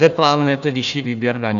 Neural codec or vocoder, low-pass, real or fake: codec, 16 kHz, 1 kbps, FunCodec, trained on Chinese and English, 50 frames a second; 7.2 kHz; fake